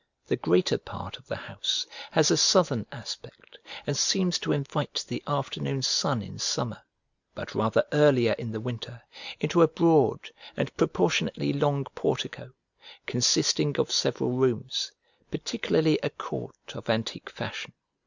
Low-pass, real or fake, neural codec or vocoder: 7.2 kHz; real; none